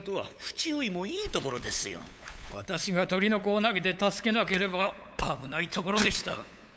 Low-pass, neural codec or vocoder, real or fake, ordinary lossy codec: none; codec, 16 kHz, 8 kbps, FunCodec, trained on LibriTTS, 25 frames a second; fake; none